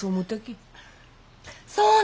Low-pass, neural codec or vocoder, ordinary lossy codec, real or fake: none; none; none; real